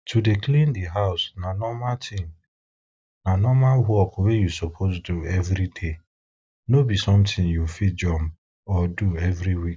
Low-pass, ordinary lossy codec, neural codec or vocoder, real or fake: none; none; none; real